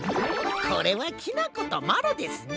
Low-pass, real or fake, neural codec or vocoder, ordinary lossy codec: none; real; none; none